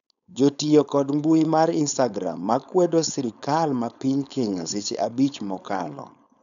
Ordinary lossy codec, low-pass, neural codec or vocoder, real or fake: none; 7.2 kHz; codec, 16 kHz, 4.8 kbps, FACodec; fake